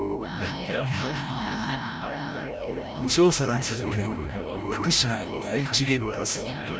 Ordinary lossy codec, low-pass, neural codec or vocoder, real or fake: none; none; codec, 16 kHz, 0.5 kbps, FreqCodec, larger model; fake